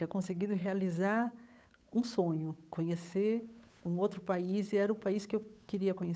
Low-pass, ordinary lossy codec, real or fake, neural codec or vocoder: none; none; fake; codec, 16 kHz, 8 kbps, FunCodec, trained on Chinese and English, 25 frames a second